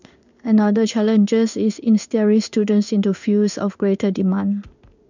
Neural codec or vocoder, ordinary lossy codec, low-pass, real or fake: codec, 16 kHz in and 24 kHz out, 1 kbps, XY-Tokenizer; none; 7.2 kHz; fake